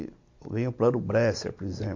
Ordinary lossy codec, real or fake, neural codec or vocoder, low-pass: MP3, 48 kbps; real; none; 7.2 kHz